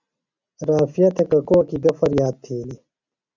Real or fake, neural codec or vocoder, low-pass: real; none; 7.2 kHz